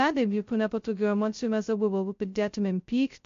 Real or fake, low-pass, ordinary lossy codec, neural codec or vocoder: fake; 7.2 kHz; MP3, 48 kbps; codec, 16 kHz, 0.2 kbps, FocalCodec